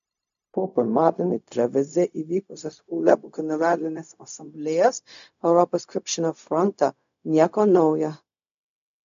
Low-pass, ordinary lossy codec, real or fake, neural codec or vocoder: 7.2 kHz; AAC, 64 kbps; fake; codec, 16 kHz, 0.4 kbps, LongCat-Audio-Codec